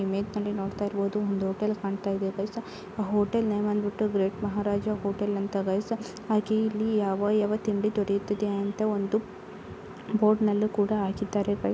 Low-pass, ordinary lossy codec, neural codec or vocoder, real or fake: none; none; none; real